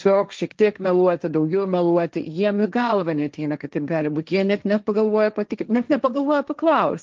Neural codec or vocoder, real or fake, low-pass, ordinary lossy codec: codec, 16 kHz, 1.1 kbps, Voila-Tokenizer; fake; 7.2 kHz; Opus, 24 kbps